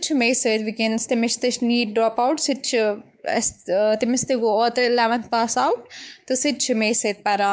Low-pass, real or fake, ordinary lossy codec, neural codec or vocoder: none; fake; none; codec, 16 kHz, 4 kbps, X-Codec, WavLM features, trained on Multilingual LibriSpeech